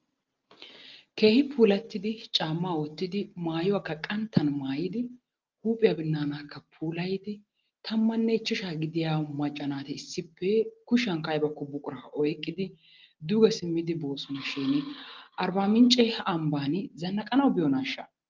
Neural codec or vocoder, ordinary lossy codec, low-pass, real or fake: none; Opus, 32 kbps; 7.2 kHz; real